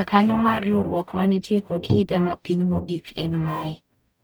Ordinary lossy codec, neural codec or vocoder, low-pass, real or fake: none; codec, 44.1 kHz, 0.9 kbps, DAC; none; fake